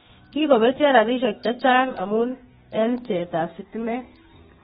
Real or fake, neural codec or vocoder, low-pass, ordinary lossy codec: fake; codec, 32 kHz, 1.9 kbps, SNAC; 14.4 kHz; AAC, 16 kbps